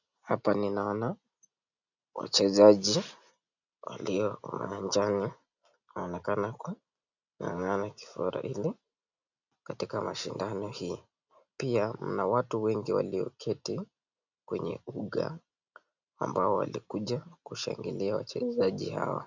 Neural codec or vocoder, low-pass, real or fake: none; 7.2 kHz; real